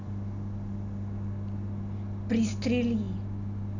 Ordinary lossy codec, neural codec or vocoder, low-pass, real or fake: none; none; 7.2 kHz; real